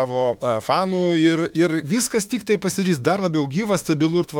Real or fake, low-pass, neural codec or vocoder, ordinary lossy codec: fake; 19.8 kHz; autoencoder, 48 kHz, 32 numbers a frame, DAC-VAE, trained on Japanese speech; MP3, 96 kbps